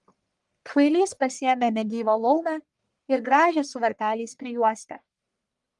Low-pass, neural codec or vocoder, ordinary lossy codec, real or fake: 10.8 kHz; codec, 44.1 kHz, 1.7 kbps, Pupu-Codec; Opus, 32 kbps; fake